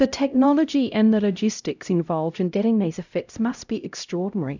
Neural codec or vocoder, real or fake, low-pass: codec, 16 kHz, 0.5 kbps, X-Codec, HuBERT features, trained on LibriSpeech; fake; 7.2 kHz